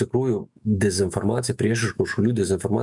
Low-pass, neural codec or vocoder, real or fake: 10.8 kHz; autoencoder, 48 kHz, 128 numbers a frame, DAC-VAE, trained on Japanese speech; fake